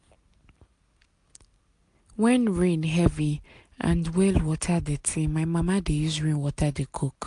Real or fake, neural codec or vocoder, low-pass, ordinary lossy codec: real; none; 10.8 kHz; AAC, 64 kbps